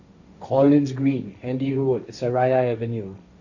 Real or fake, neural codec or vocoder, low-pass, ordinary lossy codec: fake; codec, 16 kHz, 1.1 kbps, Voila-Tokenizer; 7.2 kHz; none